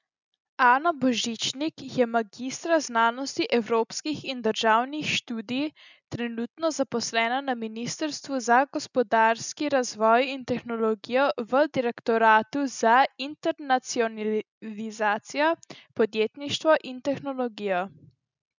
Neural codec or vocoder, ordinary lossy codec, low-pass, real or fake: none; none; 7.2 kHz; real